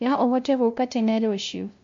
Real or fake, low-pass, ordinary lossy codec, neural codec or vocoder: fake; 7.2 kHz; MP3, 48 kbps; codec, 16 kHz, 0.5 kbps, FunCodec, trained on LibriTTS, 25 frames a second